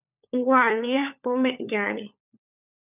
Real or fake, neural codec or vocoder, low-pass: fake; codec, 16 kHz, 4 kbps, FunCodec, trained on LibriTTS, 50 frames a second; 3.6 kHz